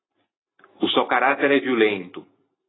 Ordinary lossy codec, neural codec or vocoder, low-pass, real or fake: AAC, 16 kbps; none; 7.2 kHz; real